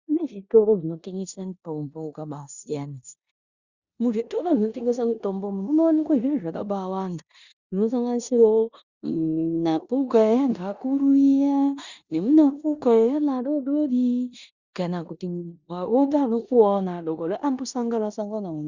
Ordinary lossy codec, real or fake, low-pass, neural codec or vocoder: Opus, 64 kbps; fake; 7.2 kHz; codec, 16 kHz in and 24 kHz out, 0.9 kbps, LongCat-Audio-Codec, four codebook decoder